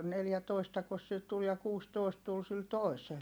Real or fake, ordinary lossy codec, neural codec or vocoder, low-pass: real; none; none; none